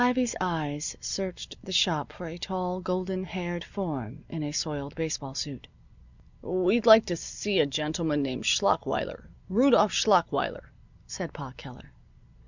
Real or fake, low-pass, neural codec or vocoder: real; 7.2 kHz; none